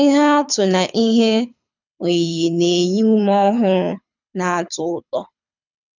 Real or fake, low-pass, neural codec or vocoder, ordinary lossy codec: fake; 7.2 kHz; codec, 24 kHz, 6 kbps, HILCodec; none